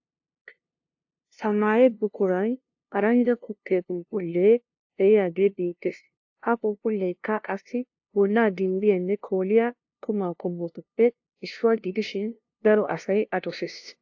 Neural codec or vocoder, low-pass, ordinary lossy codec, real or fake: codec, 16 kHz, 0.5 kbps, FunCodec, trained on LibriTTS, 25 frames a second; 7.2 kHz; AAC, 48 kbps; fake